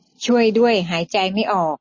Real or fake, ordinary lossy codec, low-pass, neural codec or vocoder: real; MP3, 32 kbps; 7.2 kHz; none